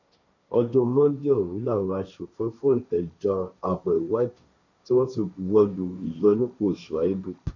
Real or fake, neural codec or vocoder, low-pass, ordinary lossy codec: fake; codec, 16 kHz, 1.1 kbps, Voila-Tokenizer; 7.2 kHz; none